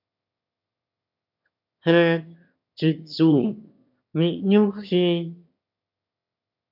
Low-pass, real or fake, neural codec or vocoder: 5.4 kHz; fake; autoencoder, 22.05 kHz, a latent of 192 numbers a frame, VITS, trained on one speaker